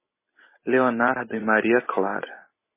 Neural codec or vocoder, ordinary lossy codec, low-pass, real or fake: vocoder, 44.1 kHz, 128 mel bands every 256 samples, BigVGAN v2; MP3, 16 kbps; 3.6 kHz; fake